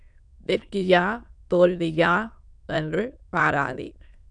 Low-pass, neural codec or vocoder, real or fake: 9.9 kHz; autoencoder, 22.05 kHz, a latent of 192 numbers a frame, VITS, trained on many speakers; fake